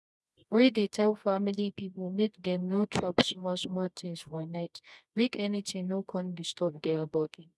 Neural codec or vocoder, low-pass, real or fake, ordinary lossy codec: codec, 24 kHz, 0.9 kbps, WavTokenizer, medium music audio release; none; fake; none